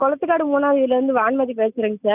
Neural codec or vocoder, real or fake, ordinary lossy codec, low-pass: none; real; none; 3.6 kHz